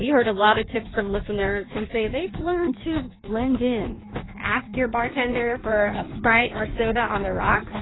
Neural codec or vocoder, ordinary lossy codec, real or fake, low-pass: codec, 16 kHz in and 24 kHz out, 1.1 kbps, FireRedTTS-2 codec; AAC, 16 kbps; fake; 7.2 kHz